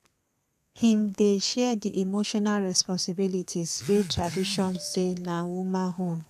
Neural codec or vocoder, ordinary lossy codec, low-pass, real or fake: codec, 32 kHz, 1.9 kbps, SNAC; none; 14.4 kHz; fake